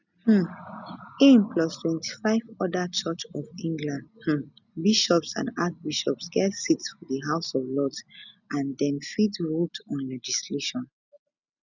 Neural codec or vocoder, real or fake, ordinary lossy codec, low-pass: none; real; none; 7.2 kHz